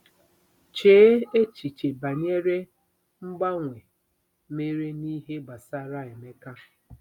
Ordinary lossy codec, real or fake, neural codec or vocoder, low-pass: none; real; none; 19.8 kHz